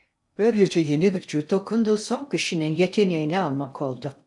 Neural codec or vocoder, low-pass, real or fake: codec, 16 kHz in and 24 kHz out, 0.6 kbps, FocalCodec, streaming, 2048 codes; 10.8 kHz; fake